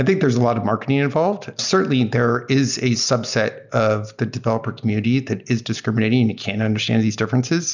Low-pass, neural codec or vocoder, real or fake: 7.2 kHz; none; real